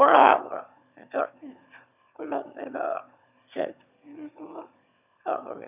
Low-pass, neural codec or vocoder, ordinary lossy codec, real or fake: 3.6 kHz; autoencoder, 22.05 kHz, a latent of 192 numbers a frame, VITS, trained on one speaker; none; fake